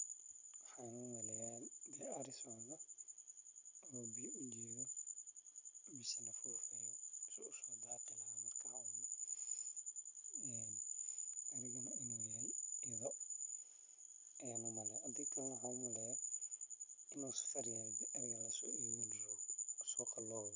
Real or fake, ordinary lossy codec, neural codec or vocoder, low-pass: real; none; none; 7.2 kHz